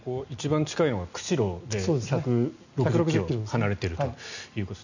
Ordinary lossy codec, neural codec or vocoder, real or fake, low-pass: none; none; real; 7.2 kHz